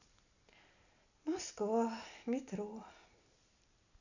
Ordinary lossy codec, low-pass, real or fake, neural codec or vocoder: none; 7.2 kHz; real; none